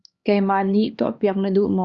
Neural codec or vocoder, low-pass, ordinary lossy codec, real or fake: codec, 16 kHz, 2 kbps, X-Codec, HuBERT features, trained on LibriSpeech; 7.2 kHz; Opus, 64 kbps; fake